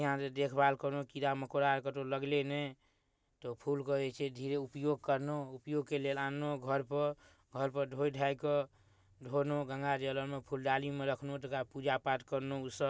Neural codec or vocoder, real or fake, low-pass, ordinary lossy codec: none; real; none; none